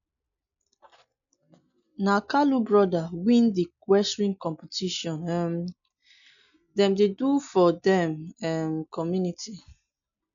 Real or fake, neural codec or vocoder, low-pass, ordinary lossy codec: real; none; 7.2 kHz; none